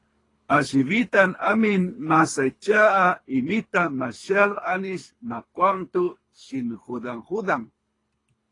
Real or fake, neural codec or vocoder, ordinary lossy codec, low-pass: fake; codec, 24 kHz, 3 kbps, HILCodec; AAC, 32 kbps; 10.8 kHz